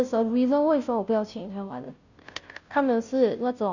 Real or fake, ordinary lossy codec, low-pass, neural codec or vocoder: fake; none; 7.2 kHz; codec, 16 kHz, 0.5 kbps, FunCodec, trained on Chinese and English, 25 frames a second